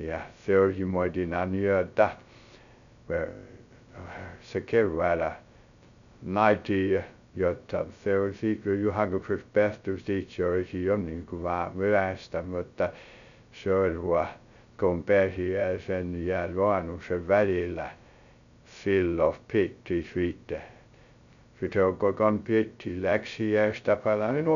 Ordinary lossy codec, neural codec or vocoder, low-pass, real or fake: MP3, 96 kbps; codec, 16 kHz, 0.2 kbps, FocalCodec; 7.2 kHz; fake